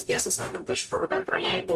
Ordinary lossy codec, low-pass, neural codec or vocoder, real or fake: Opus, 64 kbps; 14.4 kHz; codec, 44.1 kHz, 0.9 kbps, DAC; fake